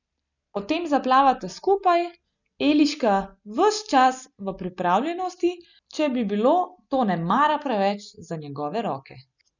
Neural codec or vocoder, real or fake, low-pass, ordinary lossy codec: none; real; 7.2 kHz; none